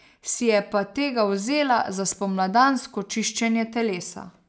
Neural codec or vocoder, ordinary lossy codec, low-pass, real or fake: none; none; none; real